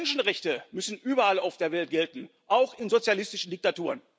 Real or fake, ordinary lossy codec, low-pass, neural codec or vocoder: real; none; none; none